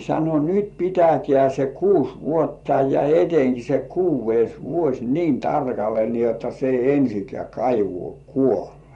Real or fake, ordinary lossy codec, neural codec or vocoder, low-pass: fake; MP3, 64 kbps; vocoder, 24 kHz, 100 mel bands, Vocos; 10.8 kHz